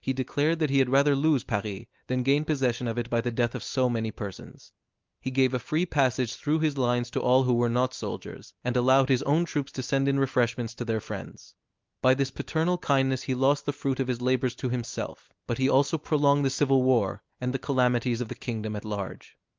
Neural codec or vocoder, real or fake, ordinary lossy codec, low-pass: none; real; Opus, 32 kbps; 7.2 kHz